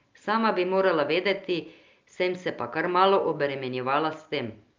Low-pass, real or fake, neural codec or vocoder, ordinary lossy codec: 7.2 kHz; real; none; Opus, 24 kbps